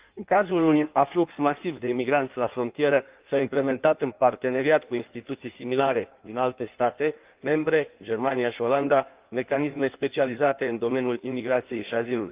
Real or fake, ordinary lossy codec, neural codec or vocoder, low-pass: fake; Opus, 24 kbps; codec, 16 kHz in and 24 kHz out, 1.1 kbps, FireRedTTS-2 codec; 3.6 kHz